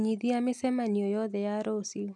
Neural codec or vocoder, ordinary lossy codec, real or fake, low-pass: none; none; real; none